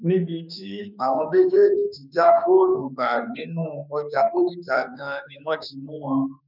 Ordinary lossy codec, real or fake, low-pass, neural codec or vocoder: none; fake; 5.4 kHz; autoencoder, 48 kHz, 32 numbers a frame, DAC-VAE, trained on Japanese speech